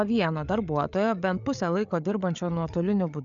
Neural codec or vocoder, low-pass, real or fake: codec, 16 kHz, 8 kbps, FreqCodec, larger model; 7.2 kHz; fake